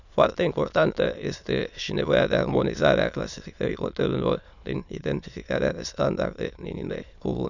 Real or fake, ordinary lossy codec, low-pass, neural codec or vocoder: fake; none; 7.2 kHz; autoencoder, 22.05 kHz, a latent of 192 numbers a frame, VITS, trained on many speakers